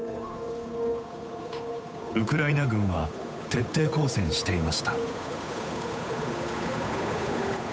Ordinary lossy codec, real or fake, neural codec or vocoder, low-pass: none; fake; codec, 16 kHz, 8 kbps, FunCodec, trained on Chinese and English, 25 frames a second; none